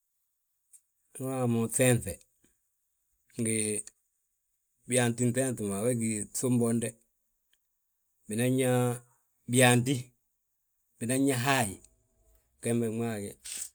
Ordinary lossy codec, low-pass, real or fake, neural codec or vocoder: none; none; real; none